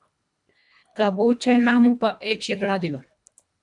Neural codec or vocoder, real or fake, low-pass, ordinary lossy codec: codec, 24 kHz, 1.5 kbps, HILCodec; fake; 10.8 kHz; MP3, 96 kbps